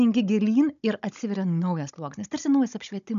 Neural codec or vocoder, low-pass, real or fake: codec, 16 kHz, 16 kbps, FunCodec, trained on Chinese and English, 50 frames a second; 7.2 kHz; fake